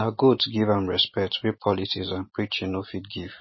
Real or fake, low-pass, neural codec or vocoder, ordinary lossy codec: real; 7.2 kHz; none; MP3, 24 kbps